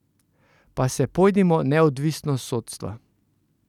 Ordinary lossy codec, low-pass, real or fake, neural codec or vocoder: none; 19.8 kHz; real; none